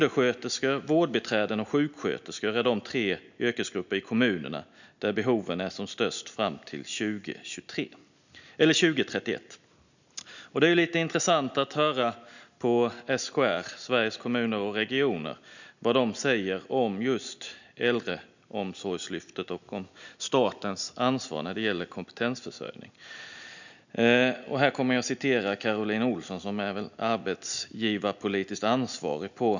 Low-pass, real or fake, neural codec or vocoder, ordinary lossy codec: 7.2 kHz; real; none; none